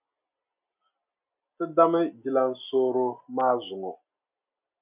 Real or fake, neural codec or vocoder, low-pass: real; none; 3.6 kHz